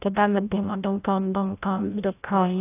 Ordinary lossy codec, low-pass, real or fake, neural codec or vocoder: AAC, 24 kbps; 3.6 kHz; fake; codec, 16 kHz, 0.5 kbps, FreqCodec, larger model